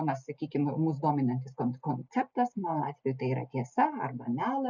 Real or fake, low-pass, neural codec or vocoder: real; 7.2 kHz; none